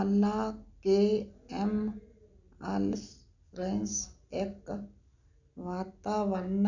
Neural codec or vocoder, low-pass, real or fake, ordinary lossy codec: none; 7.2 kHz; real; none